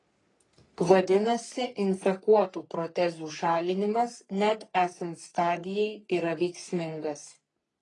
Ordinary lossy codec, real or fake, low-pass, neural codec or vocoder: AAC, 32 kbps; fake; 10.8 kHz; codec, 44.1 kHz, 3.4 kbps, Pupu-Codec